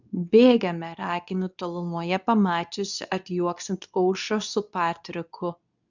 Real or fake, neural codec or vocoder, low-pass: fake; codec, 24 kHz, 0.9 kbps, WavTokenizer, medium speech release version 2; 7.2 kHz